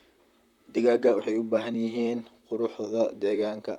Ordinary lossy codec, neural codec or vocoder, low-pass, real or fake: none; vocoder, 44.1 kHz, 128 mel bands, Pupu-Vocoder; 19.8 kHz; fake